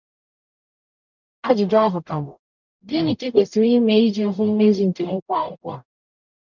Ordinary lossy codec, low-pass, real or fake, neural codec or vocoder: none; 7.2 kHz; fake; codec, 44.1 kHz, 0.9 kbps, DAC